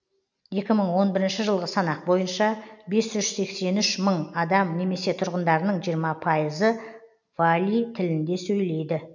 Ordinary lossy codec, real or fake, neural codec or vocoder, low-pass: none; real; none; 7.2 kHz